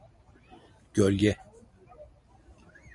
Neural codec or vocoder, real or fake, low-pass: none; real; 10.8 kHz